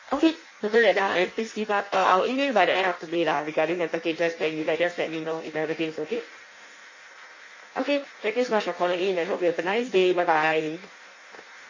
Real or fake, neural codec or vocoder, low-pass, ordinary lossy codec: fake; codec, 16 kHz in and 24 kHz out, 0.6 kbps, FireRedTTS-2 codec; 7.2 kHz; MP3, 32 kbps